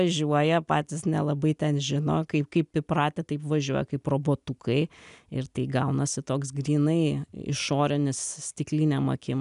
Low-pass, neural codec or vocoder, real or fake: 10.8 kHz; none; real